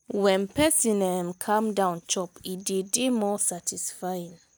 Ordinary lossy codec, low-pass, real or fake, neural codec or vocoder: none; none; real; none